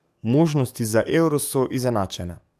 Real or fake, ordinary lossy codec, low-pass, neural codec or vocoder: fake; AAC, 96 kbps; 14.4 kHz; codec, 44.1 kHz, 7.8 kbps, DAC